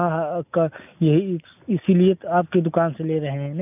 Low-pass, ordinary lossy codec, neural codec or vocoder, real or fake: 3.6 kHz; none; none; real